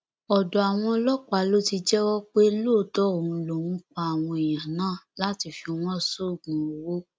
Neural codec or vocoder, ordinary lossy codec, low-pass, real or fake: none; none; none; real